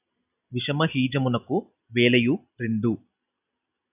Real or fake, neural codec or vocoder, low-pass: real; none; 3.6 kHz